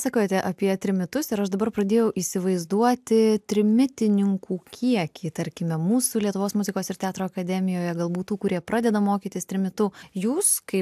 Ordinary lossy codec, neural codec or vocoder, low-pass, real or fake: AAC, 96 kbps; none; 14.4 kHz; real